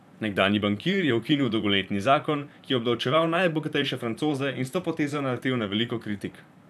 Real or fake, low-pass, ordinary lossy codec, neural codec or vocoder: fake; 14.4 kHz; none; vocoder, 44.1 kHz, 128 mel bands, Pupu-Vocoder